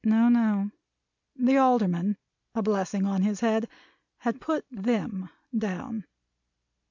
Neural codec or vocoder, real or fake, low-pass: none; real; 7.2 kHz